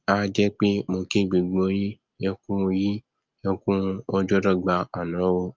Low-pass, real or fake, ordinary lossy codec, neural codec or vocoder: 7.2 kHz; real; Opus, 24 kbps; none